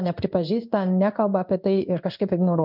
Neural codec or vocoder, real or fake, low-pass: codec, 16 kHz in and 24 kHz out, 1 kbps, XY-Tokenizer; fake; 5.4 kHz